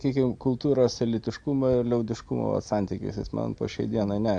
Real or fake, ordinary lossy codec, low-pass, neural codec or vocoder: real; AAC, 64 kbps; 9.9 kHz; none